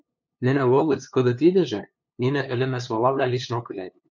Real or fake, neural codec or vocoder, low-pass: fake; codec, 16 kHz, 2 kbps, FunCodec, trained on LibriTTS, 25 frames a second; 7.2 kHz